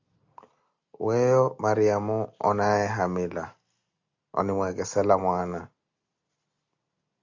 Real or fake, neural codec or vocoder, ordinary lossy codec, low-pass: real; none; Opus, 64 kbps; 7.2 kHz